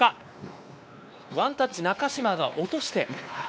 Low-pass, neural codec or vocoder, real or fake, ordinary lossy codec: none; codec, 16 kHz, 2 kbps, X-Codec, WavLM features, trained on Multilingual LibriSpeech; fake; none